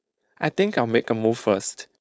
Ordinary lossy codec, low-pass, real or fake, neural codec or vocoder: none; none; fake; codec, 16 kHz, 4.8 kbps, FACodec